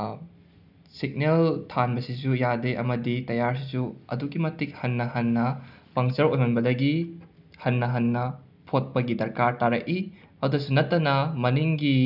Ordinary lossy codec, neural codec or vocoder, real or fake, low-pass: none; none; real; 5.4 kHz